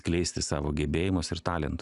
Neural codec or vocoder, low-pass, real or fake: none; 10.8 kHz; real